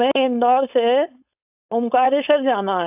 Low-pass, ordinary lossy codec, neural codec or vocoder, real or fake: 3.6 kHz; AAC, 32 kbps; codec, 16 kHz, 4.8 kbps, FACodec; fake